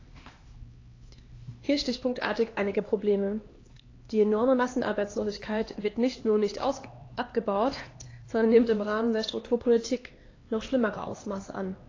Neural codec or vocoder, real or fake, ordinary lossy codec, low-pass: codec, 16 kHz, 2 kbps, X-Codec, HuBERT features, trained on LibriSpeech; fake; AAC, 32 kbps; 7.2 kHz